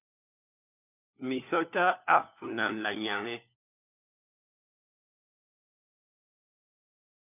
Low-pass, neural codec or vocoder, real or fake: 3.6 kHz; codec, 16 kHz, 4 kbps, FunCodec, trained on LibriTTS, 50 frames a second; fake